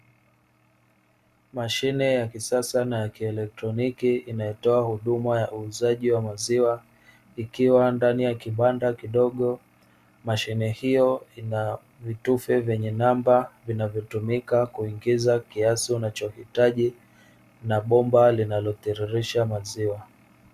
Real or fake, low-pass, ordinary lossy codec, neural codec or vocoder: real; 14.4 kHz; Opus, 64 kbps; none